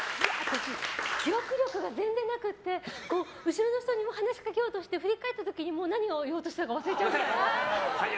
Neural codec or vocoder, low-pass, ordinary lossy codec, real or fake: none; none; none; real